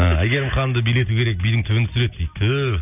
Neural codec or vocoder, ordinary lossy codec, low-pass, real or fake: none; none; 3.6 kHz; real